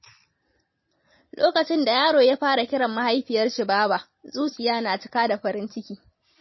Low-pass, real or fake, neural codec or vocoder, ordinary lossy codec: 7.2 kHz; real; none; MP3, 24 kbps